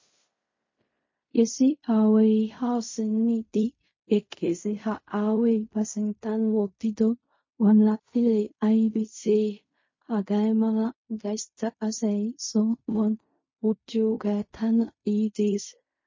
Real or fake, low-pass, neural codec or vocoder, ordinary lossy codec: fake; 7.2 kHz; codec, 16 kHz in and 24 kHz out, 0.4 kbps, LongCat-Audio-Codec, fine tuned four codebook decoder; MP3, 32 kbps